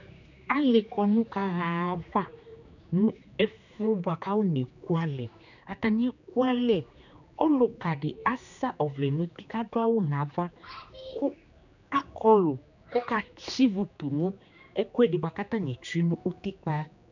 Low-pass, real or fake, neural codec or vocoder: 7.2 kHz; fake; codec, 16 kHz, 2 kbps, X-Codec, HuBERT features, trained on general audio